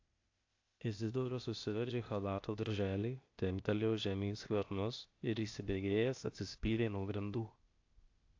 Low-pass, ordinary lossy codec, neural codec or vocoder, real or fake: 7.2 kHz; AAC, 48 kbps; codec, 16 kHz, 0.8 kbps, ZipCodec; fake